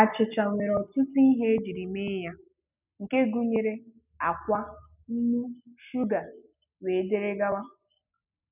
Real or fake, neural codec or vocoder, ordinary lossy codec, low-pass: real; none; none; 3.6 kHz